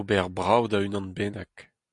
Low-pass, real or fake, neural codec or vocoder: 10.8 kHz; real; none